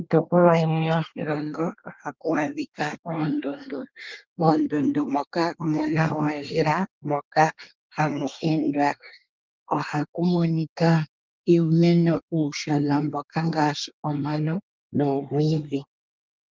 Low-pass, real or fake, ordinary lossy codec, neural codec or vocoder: 7.2 kHz; fake; Opus, 32 kbps; codec, 24 kHz, 1 kbps, SNAC